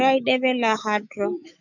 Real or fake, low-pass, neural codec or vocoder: fake; 7.2 kHz; autoencoder, 48 kHz, 128 numbers a frame, DAC-VAE, trained on Japanese speech